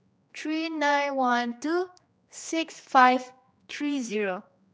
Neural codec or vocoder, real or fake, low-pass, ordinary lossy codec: codec, 16 kHz, 2 kbps, X-Codec, HuBERT features, trained on general audio; fake; none; none